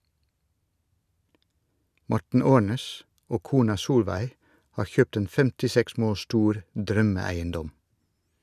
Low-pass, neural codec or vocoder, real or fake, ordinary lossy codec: 14.4 kHz; none; real; none